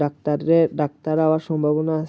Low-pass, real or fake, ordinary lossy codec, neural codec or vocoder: none; real; none; none